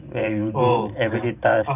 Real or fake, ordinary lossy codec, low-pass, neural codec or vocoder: fake; none; 3.6 kHz; vocoder, 44.1 kHz, 128 mel bands every 512 samples, BigVGAN v2